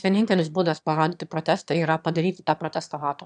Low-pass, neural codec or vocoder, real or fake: 9.9 kHz; autoencoder, 22.05 kHz, a latent of 192 numbers a frame, VITS, trained on one speaker; fake